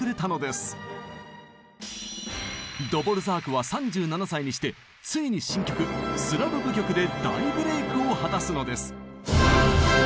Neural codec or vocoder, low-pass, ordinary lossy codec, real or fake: none; none; none; real